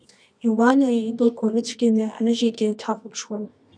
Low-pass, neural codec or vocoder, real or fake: 9.9 kHz; codec, 24 kHz, 0.9 kbps, WavTokenizer, medium music audio release; fake